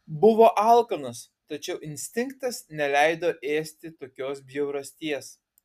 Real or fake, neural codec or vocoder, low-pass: real; none; 14.4 kHz